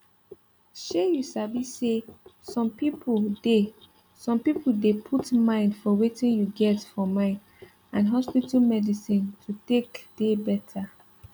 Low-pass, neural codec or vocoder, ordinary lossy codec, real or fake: 19.8 kHz; none; none; real